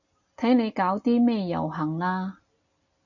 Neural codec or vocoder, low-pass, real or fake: none; 7.2 kHz; real